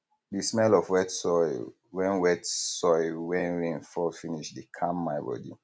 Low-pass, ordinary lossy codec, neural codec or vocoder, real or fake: none; none; none; real